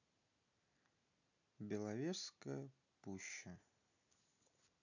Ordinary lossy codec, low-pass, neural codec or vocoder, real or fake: none; 7.2 kHz; none; real